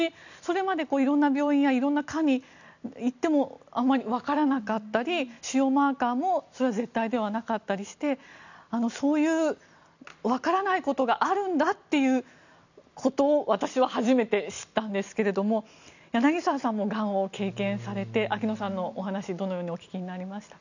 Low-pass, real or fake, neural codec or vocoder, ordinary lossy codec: 7.2 kHz; real; none; none